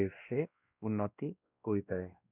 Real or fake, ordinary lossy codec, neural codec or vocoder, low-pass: fake; none; codec, 16 kHz, 1 kbps, X-Codec, WavLM features, trained on Multilingual LibriSpeech; 3.6 kHz